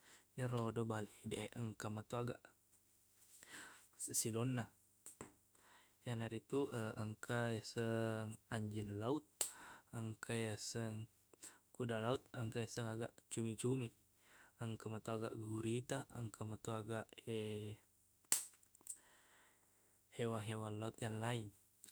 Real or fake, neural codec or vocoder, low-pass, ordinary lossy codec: fake; autoencoder, 48 kHz, 32 numbers a frame, DAC-VAE, trained on Japanese speech; none; none